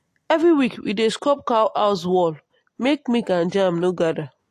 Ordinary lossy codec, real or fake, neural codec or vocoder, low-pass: AAC, 48 kbps; real; none; 14.4 kHz